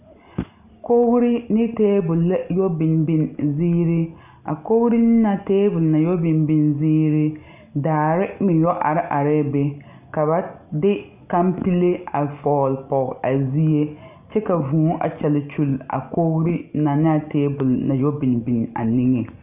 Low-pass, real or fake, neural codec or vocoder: 3.6 kHz; real; none